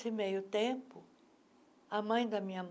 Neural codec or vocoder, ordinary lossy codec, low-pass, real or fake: none; none; none; real